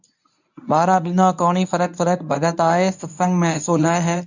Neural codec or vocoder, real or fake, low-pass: codec, 24 kHz, 0.9 kbps, WavTokenizer, medium speech release version 1; fake; 7.2 kHz